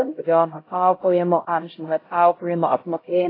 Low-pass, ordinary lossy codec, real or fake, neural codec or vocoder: 5.4 kHz; AAC, 24 kbps; fake; codec, 16 kHz, 0.5 kbps, X-Codec, HuBERT features, trained on LibriSpeech